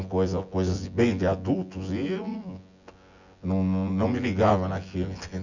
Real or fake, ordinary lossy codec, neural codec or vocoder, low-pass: fake; none; vocoder, 24 kHz, 100 mel bands, Vocos; 7.2 kHz